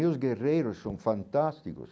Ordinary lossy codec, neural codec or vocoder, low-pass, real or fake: none; none; none; real